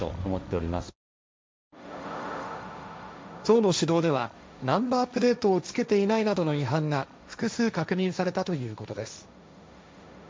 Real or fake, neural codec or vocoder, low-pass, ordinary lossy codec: fake; codec, 16 kHz, 1.1 kbps, Voila-Tokenizer; none; none